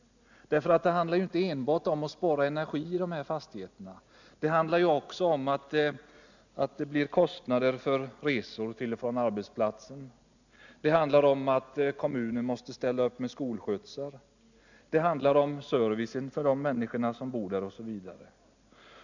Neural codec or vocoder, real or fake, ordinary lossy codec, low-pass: none; real; none; 7.2 kHz